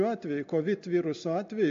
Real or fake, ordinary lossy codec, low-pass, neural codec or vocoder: real; MP3, 48 kbps; 7.2 kHz; none